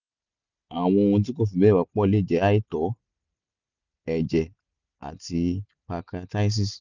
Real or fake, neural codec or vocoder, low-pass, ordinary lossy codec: real; none; 7.2 kHz; none